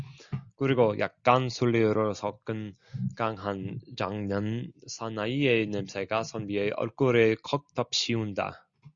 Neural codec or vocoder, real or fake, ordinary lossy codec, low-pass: none; real; Opus, 64 kbps; 7.2 kHz